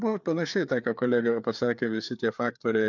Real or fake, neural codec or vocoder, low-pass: fake; codec, 16 kHz, 4 kbps, FreqCodec, larger model; 7.2 kHz